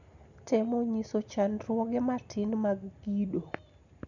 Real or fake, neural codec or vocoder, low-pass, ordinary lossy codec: real; none; 7.2 kHz; none